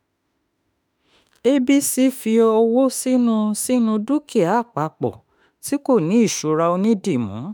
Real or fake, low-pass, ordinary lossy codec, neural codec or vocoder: fake; none; none; autoencoder, 48 kHz, 32 numbers a frame, DAC-VAE, trained on Japanese speech